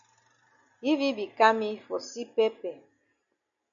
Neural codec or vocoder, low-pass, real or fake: none; 7.2 kHz; real